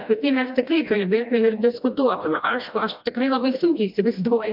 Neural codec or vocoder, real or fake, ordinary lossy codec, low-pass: codec, 16 kHz, 1 kbps, FreqCodec, smaller model; fake; MP3, 48 kbps; 5.4 kHz